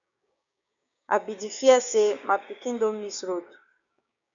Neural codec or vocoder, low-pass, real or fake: codec, 16 kHz, 6 kbps, DAC; 7.2 kHz; fake